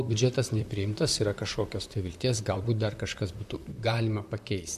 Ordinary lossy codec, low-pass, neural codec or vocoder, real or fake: MP3, 64 kbps; 14.4 kHz; vocoder, 44.1 kHz, 128 mel bands, Pupu-Vocoder; fake